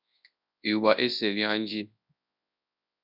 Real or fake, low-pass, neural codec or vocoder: fake; 5.4 kHz; codec, 24 kHz, 0.9 kbps, WavTokenizer, large speech release